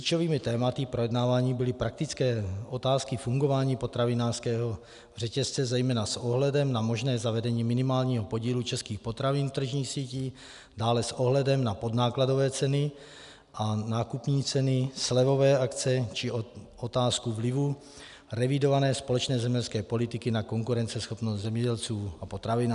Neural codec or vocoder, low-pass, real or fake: none; 10.8 kHz; real